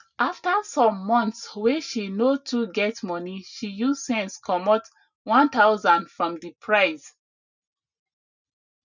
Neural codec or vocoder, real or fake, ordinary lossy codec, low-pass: none; real; none; 7.2 kHz